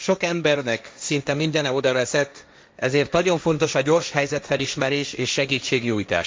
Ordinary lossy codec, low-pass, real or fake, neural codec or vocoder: none; none; fake; codec, 16 kHz, 1.1 kbps, Voila-Tokenizer